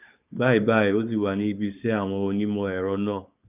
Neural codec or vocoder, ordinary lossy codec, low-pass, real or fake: codec, 16 kHz, 4.8 kbps, FACodec; none; 3.6 kHz; fake